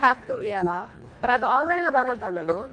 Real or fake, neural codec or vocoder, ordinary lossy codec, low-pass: fake; codec, 24 kHz, 1.5 kbps, HILCodec; MP3, 64 kbps; 9.9 kHz